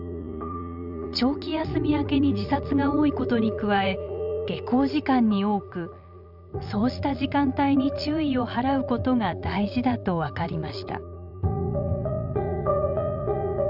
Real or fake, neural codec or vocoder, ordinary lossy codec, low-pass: fake; vocoder, 44.1 kHz, 80 mel bands, Vocos; none; 5.4 kHz